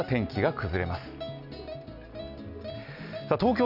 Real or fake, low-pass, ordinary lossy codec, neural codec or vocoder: real; 5.4 kHz; none; none